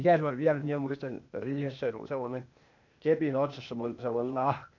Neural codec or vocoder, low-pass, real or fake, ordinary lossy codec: codec, 16 kHz, 0.8 kbps, ZipCodec; 7.2 kHz; fake; none